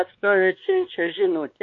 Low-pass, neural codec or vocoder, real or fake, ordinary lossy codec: 7.2 kHz; codec, 16 kHz, 2 kbps, X-Codec, WavLM features, trained on Multilingual LibriSpeech; fake; MP3, 48 kbps